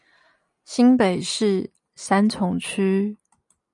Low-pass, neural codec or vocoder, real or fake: 10.8 kHz; none; real